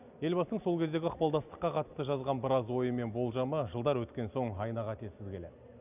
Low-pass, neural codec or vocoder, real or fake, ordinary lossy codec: 3.6 kHz; none; real; none